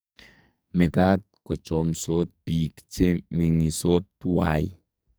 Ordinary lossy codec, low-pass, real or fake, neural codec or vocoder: none; none; fake; codec, 44.1 kHz, 2.6 kbps, SNAC